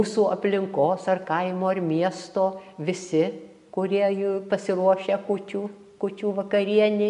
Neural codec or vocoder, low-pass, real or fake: none; 10.8 kHz; real